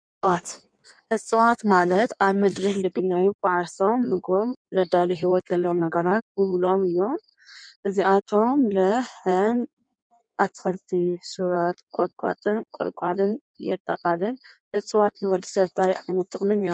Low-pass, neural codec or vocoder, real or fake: 9.9 kHz; codec, 16 kHz in and 24 kHz out, 1.1 kbps, FireRedTTS-2 codec; fake